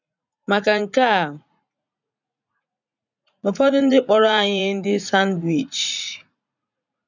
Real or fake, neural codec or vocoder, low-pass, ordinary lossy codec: fake; vocoder, 44.1 kHz, 80 mel bands, Vocos; 7.2 kHz; none